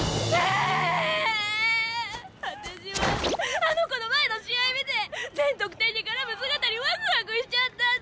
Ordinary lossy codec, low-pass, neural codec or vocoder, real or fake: none; none; none; real